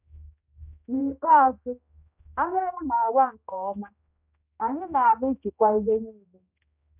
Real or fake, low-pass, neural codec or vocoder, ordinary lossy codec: fake; 3.6 kHz; codec, 16 kHz, 1 kbps, X-Codec, HuBERT features, trained on general audio; none